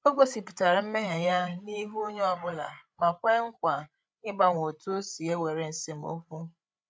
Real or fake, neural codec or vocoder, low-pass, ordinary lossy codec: fake; codec, 16 kHz, 8 kbps, FreqCodec, larger model; none; none